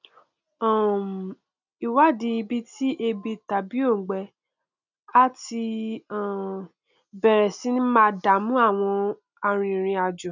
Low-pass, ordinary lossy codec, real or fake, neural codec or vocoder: 7.2 kHz; none; real; none